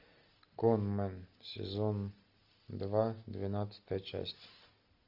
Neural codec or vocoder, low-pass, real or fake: none; 5.4 kHz; real